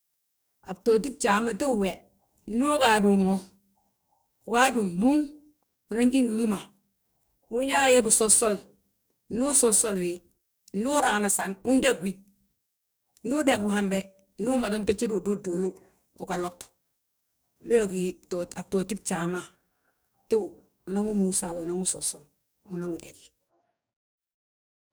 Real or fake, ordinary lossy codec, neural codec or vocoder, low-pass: fake; none; codec, 44.1 kHz, 2.6 kbps, DAC; none